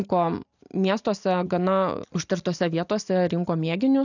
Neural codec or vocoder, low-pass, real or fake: none; 7.2 kHz; real